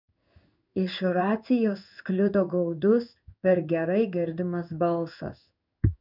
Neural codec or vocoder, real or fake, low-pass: codec, 16 kHz in and 24 kHz out, 1 kbps, XY-Tokenizer; fake; 5.4 kHz